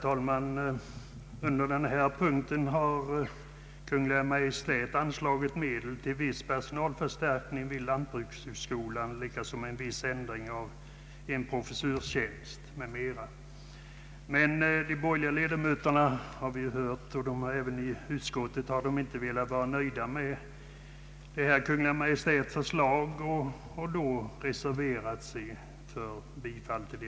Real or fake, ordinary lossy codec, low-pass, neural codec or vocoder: real; none; none; none